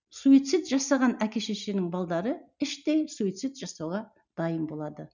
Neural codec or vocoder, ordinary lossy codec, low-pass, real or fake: none; none; 7.2 kHz; real